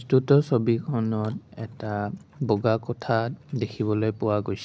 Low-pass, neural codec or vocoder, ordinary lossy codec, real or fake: none; none; none; real